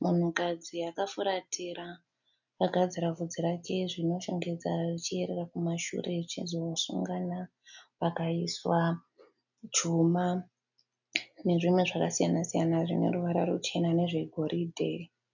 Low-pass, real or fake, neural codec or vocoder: 7.2 kHz; real; none